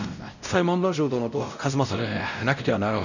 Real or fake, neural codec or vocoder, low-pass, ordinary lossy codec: fake; codec, 16 kHz, 0.5 kbps, X-Codec, WavLM features, trained on Multilingual LibriSpeech; 7.2 kHz; none